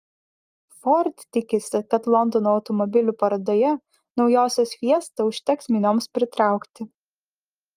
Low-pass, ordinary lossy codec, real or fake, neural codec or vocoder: 14.4 kHz; Opus, 32 kbps; real; none